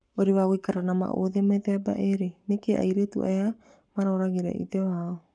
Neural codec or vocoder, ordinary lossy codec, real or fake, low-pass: codec, 44.1 kHz, 7.8 kbps, Pupu-Codec; none; fake; 9.9 kHz